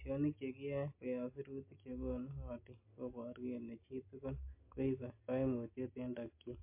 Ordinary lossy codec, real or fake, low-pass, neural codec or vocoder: MP3, 24 kbps; real; 3.6 kHz; none